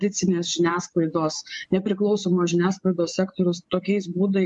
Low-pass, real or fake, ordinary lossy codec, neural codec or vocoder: 10.8 kHz; fake; MP3, 64 kbps; vocoder, 24 kHz, 100 mel bands, Vocos